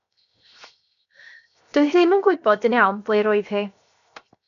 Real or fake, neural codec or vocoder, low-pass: fake; codec, 16 kHz, 0.7 kbps, FocalCodec; 7.2 kHz